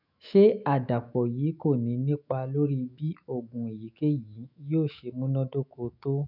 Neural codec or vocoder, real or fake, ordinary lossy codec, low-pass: autoencoder, 48 kHz, 128 numbers a frame, DAC-VAE, trained on Japanese speech; fake; none; 5.4 kHz